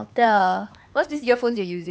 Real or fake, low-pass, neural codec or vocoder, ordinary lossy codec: fake; none; codec, 16 kHz, 4 kbps, X-Codec, HuBERT features, trained on LibriSpeech; none